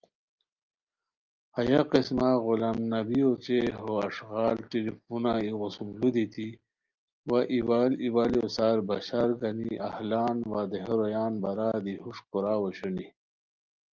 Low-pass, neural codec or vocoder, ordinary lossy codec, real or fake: 7.2 kHz; none; Opus, 24 kbps; real